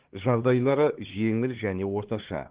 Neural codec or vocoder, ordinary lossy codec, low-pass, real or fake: codec, 16 kHz, 2 kbps, X-Codec, HuBERT features, trained on LibriSpeech; Opus, 16 kbps; 3.6 kHz; fake